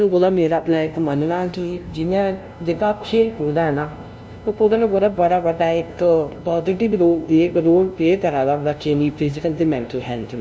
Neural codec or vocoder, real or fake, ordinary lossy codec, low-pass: codec, 16 kHz, 0.5 kbps, FunCodec, trained on LibriTTS, 25 frames a second; fake; none; none